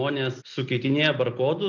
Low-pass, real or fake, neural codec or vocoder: 7.2 kHz; real; none